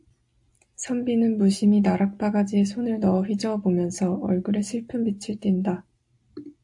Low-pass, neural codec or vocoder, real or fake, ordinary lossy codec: 10.8 kHz; none; real; AAC, 64 kbps